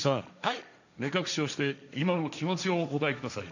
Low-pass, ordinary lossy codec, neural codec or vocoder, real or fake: 7.2 kHz; none; codec, 16 kHz, 1.1 kbps, Voila-Tokenizer; fake